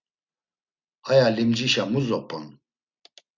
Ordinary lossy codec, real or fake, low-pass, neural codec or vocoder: Opus, 64 kbps; real; 7.2 kHz; none